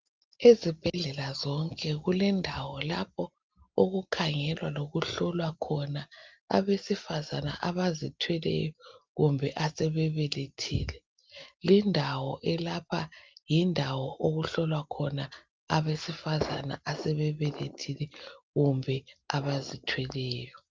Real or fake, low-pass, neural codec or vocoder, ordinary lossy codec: real; 7.2 kHz; none; Opus, 24 kbps